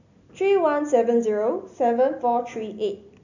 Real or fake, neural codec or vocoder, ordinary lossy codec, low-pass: real; none; none; 7.2 kHz